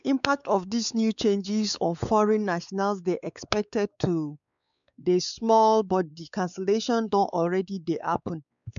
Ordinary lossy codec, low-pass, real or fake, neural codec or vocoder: none; 7.2 kHz; fake; codec, 16 kHz, 4 kbps, X-Codec, WavLM features, trained on Multilingual LibriSpeech